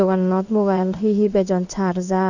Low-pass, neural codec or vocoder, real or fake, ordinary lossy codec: 7.2 kHz; codec, 16 kHz in and 24 kHz out, 1 kbps, XY-Tokenizer; fake; none